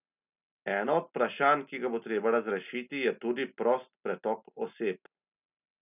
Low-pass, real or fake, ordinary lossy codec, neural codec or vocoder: 3.6 kHz; real; none; none